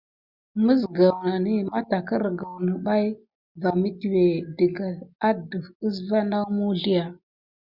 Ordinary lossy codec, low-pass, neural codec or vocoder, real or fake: Opus, 64 kbps; 5.4 kHz; none; real